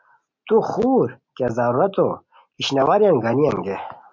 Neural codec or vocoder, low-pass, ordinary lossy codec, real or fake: none; 7.2 kHz; MP3, 48 kbps; real